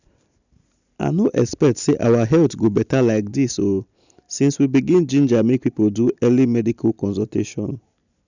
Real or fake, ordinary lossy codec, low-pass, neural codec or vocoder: real; none; 7.2 kHz; none